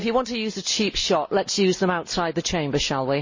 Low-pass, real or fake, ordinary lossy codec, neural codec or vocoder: 7.2 kHz; real; MP3, 32 kbps; none